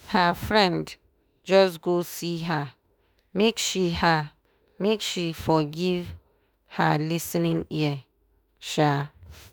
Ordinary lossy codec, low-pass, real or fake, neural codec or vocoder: none; none; fake; autoencoder, 48 kHz, 32 numbers a frame, DAC-VAE, trained on Japanese speech